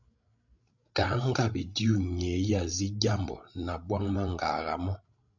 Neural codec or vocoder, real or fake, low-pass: codec, 16 kHz, 16 kbps, FreqCodec, larger model; fake; 7.2 kHz